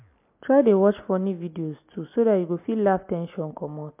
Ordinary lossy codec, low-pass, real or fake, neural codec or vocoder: MP3, 32 kbps; 3.6 kHz; real; none